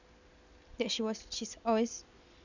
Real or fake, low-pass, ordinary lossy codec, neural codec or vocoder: real; 7.2 kHz; none; none